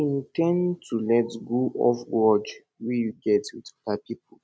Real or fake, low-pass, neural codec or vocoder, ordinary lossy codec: real; none; none; none